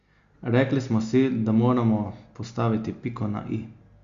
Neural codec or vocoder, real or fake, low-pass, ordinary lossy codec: none; real; 7.2 kHz; Opus, 64 kbps